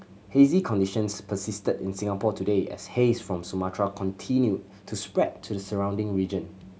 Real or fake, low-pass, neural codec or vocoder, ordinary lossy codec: real; none; none; none